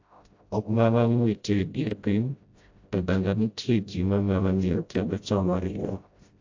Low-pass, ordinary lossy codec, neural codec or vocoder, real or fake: 7.2 kHz; AAC, 48 kbps; codec, 16 kHz, 0.5 kbps, FreqCodec, smaller model; fake